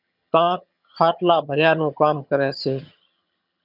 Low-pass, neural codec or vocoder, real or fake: 5.4 kHz; vocoder, 22.05 kHz, 80 mel bands, HiFi-GAN; fake